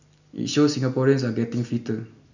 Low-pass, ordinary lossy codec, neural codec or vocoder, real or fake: 7.2 kHz; none; none; real